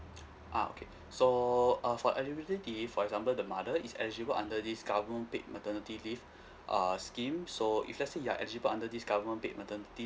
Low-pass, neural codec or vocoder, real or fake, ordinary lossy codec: none; none; real; none